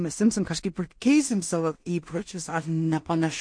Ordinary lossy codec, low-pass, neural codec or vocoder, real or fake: MP3, 48 kbps; 9.9 kHz; codec, 16 kHz in and 24 kHz out, 0.4 kbps, LongCat-Audio-Codec, two codebook decoder; fake